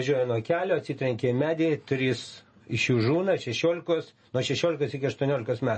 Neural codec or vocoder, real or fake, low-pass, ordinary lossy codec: none; real; 10.8 kHz; MP3, 32 kbps